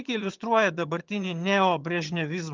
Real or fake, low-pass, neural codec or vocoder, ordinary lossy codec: fake; 7.2 kHz; vocoder, 22.05 kHz, 80 mel bands, HiFi-GAN; Opus, 32 kbps